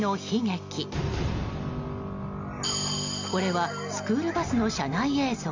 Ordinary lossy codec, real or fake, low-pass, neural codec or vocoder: MP3, 64 kbps; real; 7.2 kHz; none